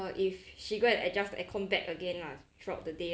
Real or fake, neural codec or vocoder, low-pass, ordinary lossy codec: real; none; none; none